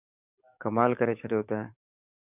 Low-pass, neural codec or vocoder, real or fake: 3.6 kHz; codec, 44.1 kHz, 7.8 kbps, DAC; fake